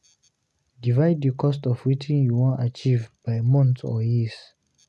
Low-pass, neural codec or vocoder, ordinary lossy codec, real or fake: none; none; none; real